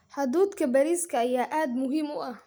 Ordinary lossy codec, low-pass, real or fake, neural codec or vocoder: none; none; real; none